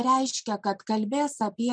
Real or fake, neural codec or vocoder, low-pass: real; none; 9.9 kHz